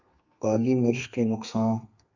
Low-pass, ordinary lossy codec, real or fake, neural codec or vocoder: 7.2 kHz; MP3, 64 kbps; fake; codec, 32 kHz, 1.9 kbps, SNAC